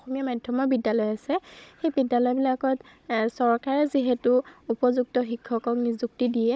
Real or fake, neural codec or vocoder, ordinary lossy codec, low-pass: fake; codec, 16 kHz, 16 kbps, FunCodec, trained on Chinese and English, 50 frames a second; none; none